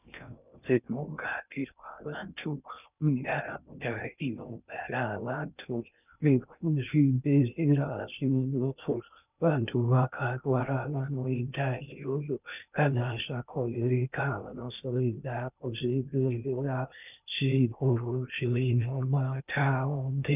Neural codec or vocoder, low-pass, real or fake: codec, 16 kHz in and 24 kHz out, 0.6 kbps, FocalCodec, streaming, 2048 codes; 3.6 kHz; fake